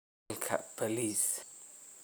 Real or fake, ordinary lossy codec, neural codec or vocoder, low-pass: real; none; none; none